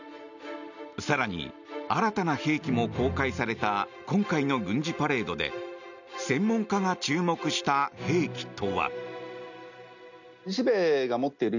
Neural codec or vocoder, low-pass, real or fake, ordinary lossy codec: none; 7.2 kHz; real; none